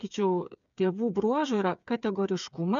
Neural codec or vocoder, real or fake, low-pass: codec, 16 kHz, 8 kbps, FreqCodec, smaller model; fake; 7.2 kHz